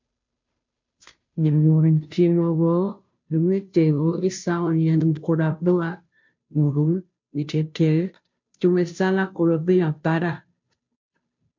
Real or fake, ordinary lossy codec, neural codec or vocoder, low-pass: fake; MP3, 48 kbps; codec, 16 kHz, 0.5 kbps, FunCodec, trained on Chinese and English, 25 frames a second; 7.2 kHz